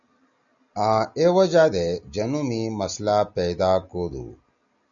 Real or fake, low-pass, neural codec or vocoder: real; 7.2 kHz; none